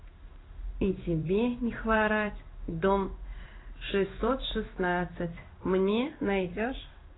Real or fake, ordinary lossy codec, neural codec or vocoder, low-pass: fake; AAC, 16 kbps; codec, 16 kHz in and 24 kHz out, 1 kbps, XY-Tokenizer; 7.2 kHz